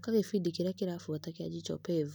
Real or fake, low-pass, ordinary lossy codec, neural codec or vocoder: real; none; none; none